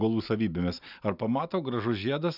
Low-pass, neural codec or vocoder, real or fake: 5.4 kHz; none; real